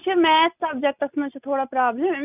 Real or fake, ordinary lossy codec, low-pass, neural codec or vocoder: real; none; 3.6 kHz; none